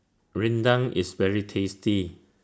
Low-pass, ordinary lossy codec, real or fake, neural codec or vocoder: none; none; real; none